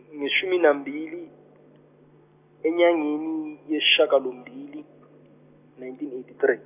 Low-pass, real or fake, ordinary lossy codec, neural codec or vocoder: 3.6 kHz; real; none; none